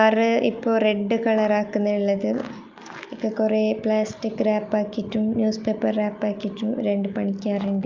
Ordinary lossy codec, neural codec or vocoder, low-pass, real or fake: Opus, 24 kbps; none; 7.2 kHz; real